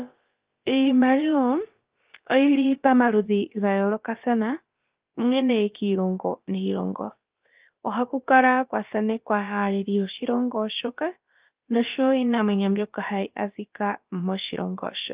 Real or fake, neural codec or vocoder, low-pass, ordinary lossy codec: fake; codec, 16 kHz, about 1 kbps, DyCAST, with the encoder's durations; 3.6 kHz; Opus, 32 kbps